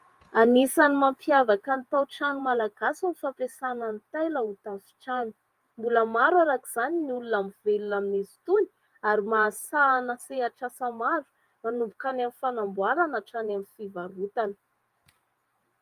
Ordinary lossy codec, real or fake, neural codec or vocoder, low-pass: Opus, 32 kbps; fake; vocoder, 48 kHz, 128 mel bands, Vocos; 14.4 kHz